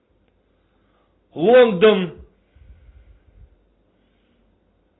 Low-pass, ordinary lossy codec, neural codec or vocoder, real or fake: 7.2 kHz; AAC, 16 kbps; none; real